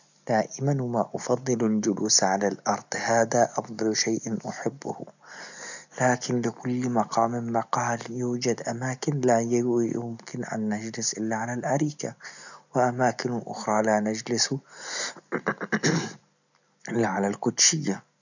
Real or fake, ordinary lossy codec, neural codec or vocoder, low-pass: real; none; none; 7.2 kHz